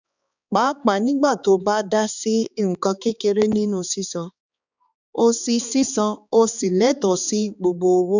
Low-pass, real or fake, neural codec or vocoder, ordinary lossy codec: 7.2 kHz; fake; codec, 16 kHz, 4 kbps, X-Codec, HuBERT features, trained on balanced general audio; none